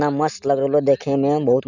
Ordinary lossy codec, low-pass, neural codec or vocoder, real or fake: none; 7.2 kHz; none; real